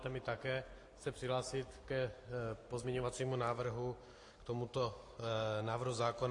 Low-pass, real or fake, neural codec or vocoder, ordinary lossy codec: 10.8 kHz; real; none; AAC, 48 kbps